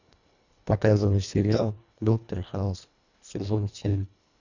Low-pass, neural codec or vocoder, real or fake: 7.2 kHz; codec, 24 kHz, 1.5 kbps, HILCodec; fake